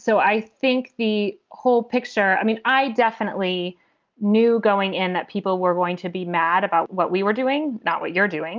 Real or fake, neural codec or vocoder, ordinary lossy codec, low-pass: real; none; Opus, 24 kbps; 7.2 kHz